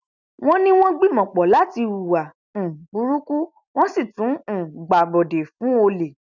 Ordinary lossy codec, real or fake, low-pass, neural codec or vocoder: none; real; 7.2 kHz; none